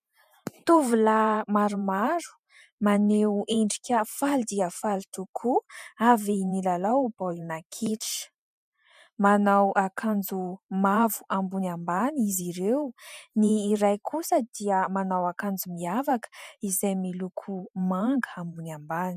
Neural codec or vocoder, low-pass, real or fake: vocoder, 44.1 kHz, 128 mel bands every 512 samples, BigVGAN v2; 14.4 kHz; fake